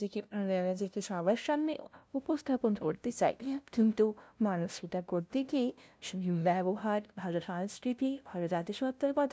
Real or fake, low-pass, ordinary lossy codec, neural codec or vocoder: fake; none; none; codec, 16 kHz, 0.5 kbps, FunCodec, trained on LibriTTS, 25 frames a second